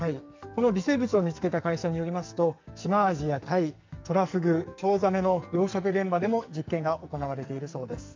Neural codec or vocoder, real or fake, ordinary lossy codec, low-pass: codec, 44.1 kHz, 2.6 kbps, SNAC; fake; MP3, 64 kbps; 7.2 kHz